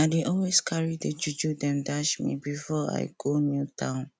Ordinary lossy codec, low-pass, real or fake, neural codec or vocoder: none; none; real; none